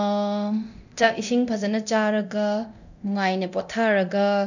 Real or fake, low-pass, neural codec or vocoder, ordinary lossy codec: fake; 7.2 kHz; codec, 24 kHz, 0.9 kbps, DualCodec; none